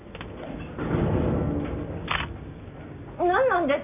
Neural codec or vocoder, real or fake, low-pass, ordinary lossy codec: none; real; 3.6 kHz; none